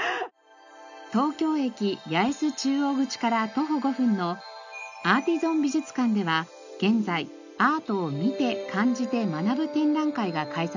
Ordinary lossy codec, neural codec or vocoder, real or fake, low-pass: none; none; real; 7.2 kHz